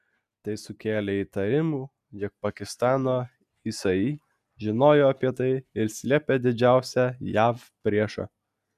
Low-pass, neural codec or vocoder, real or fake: 14.4 kHz; none; real